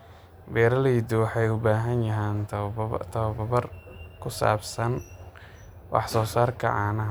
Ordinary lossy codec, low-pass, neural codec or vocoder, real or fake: none; none; none; real